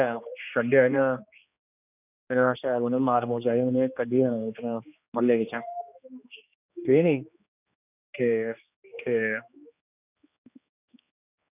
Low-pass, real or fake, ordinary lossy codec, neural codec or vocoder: 3.6 kHz; fake; none; codec, 16 kHz, 2 kbps, X-Codec, HuBERT features, trained on general audio